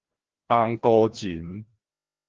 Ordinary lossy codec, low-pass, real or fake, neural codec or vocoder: Opus, 16 kbps; 7.2 kHz; fake; codec, 16 kHz, 1 kbps, FreqCodec, larger model